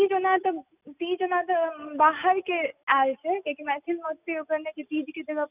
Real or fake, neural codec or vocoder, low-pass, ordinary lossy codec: real; none; 3.6 kHz; none